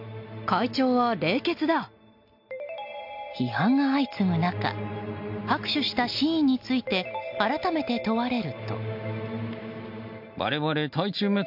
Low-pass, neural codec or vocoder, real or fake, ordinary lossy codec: 5.4 kHz; none; real; MP3, 48 kbps